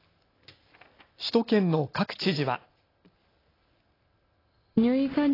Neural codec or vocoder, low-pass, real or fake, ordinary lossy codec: none; 5.4 kHz; real; AAC, 24 kbps